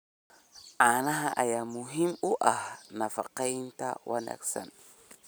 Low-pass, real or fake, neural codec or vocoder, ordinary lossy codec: none; real; none; none